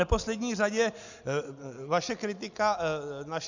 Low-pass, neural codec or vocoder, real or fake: 7.2 kHz; none; real